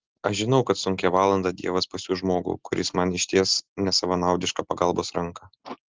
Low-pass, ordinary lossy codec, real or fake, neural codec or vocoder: 7.2 kHz; Opus, 16 kbps; real; none